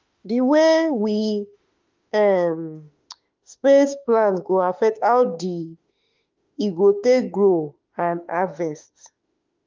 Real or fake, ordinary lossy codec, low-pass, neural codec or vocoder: fake; Opus, 32 kbps; 7.2 kHz; autoencoder, 48 kHz, 32 numbers a frame, DAC-VAE, trained on Japanese speech